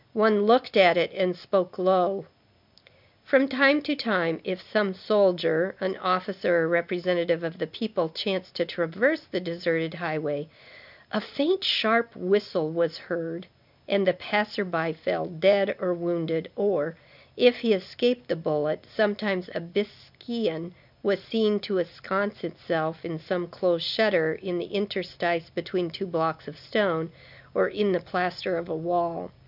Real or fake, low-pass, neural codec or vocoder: real; 5.4 kHz; none